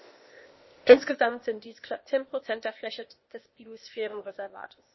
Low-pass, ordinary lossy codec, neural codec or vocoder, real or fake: 7.2 kHz; MP3, 24 kbps; codec, 16 kHz, 0.8 kbps, ZipCodec; fake